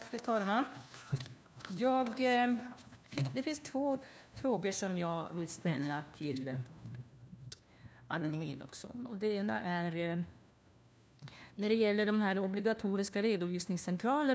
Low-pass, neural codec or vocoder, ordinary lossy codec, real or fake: none; codec, 16 kHz, 1 kbps, FunCodec, trained on LibriTTS, 50 frames a second; none; fake